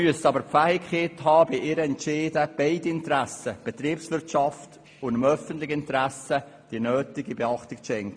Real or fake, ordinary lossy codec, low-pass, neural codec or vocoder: real; MP3, 64 kbps; 9.9 kHz; none